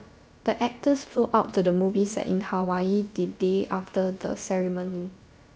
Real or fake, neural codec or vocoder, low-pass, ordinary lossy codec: fake; codec, 16 kHz, about 1 kbps, DyCAST, with the encoder's durations; none; none